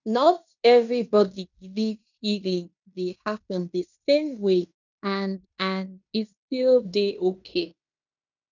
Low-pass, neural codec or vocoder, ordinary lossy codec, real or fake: 7.2 kHz; codec, 16 kHz in and 24 kHz out, 0.9 kbps, LongCat-Audio-Codec, fine tuned four codebook decoder; none; fake